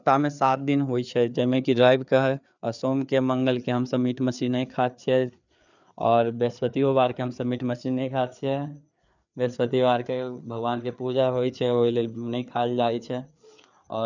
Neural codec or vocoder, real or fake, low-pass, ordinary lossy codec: codec, 16 kHz, 4 kbps, FreqCodec, larger model; fake; 7.2 kHz; none